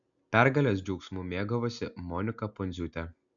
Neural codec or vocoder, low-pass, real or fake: none; 7.2 kHz; real